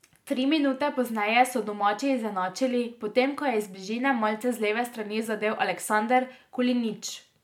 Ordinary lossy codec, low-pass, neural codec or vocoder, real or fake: MP3, 96 kbps; 19.8 kHz; none; real